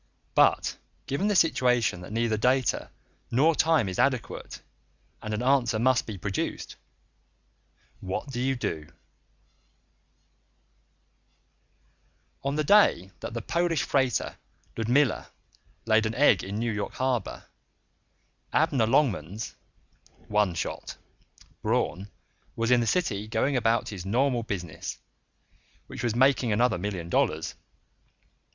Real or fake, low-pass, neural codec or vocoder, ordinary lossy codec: real; 7.2 kHz; none; Opus, 64 kbps